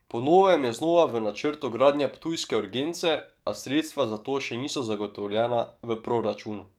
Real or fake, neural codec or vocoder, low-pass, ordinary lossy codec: fake; codec, 44.1 kHz, 7.8 kbps, DAC; 19.8 kHz; none